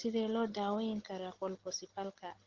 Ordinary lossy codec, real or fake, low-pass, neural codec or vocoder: Opus, 16 kbps; real; 7.2 kHz; none